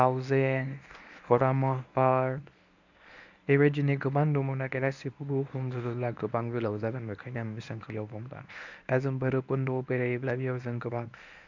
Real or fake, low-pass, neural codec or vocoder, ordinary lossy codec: fake; 7.2 kHz; codec, 24 kHz, 0.9 kbps, WavTokenizer, medium speech release version 1; none